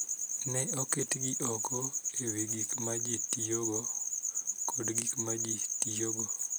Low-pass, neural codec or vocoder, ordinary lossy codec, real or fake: none; none; none; real